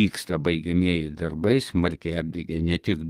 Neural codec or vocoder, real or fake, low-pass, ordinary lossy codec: codec, 32 kHz, 1.9 kbps, SNAC; fake; 14.4 kHz; Opus, 32 kbps